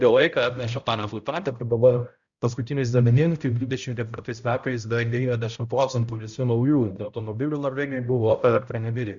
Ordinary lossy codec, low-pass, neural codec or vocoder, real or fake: Opus, 64 kbps; 7.2 kHz; codec, 16 kHz, 0.5 kbps, X-Codec, HuBERT features, trained on balanced general audio; fake